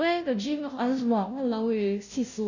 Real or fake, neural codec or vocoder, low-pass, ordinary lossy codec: fake; codec, 16 kHz, 0.5 kbps, FunCodec, trained on Chinese and English, 25 frames a second; 7.2 kHz; none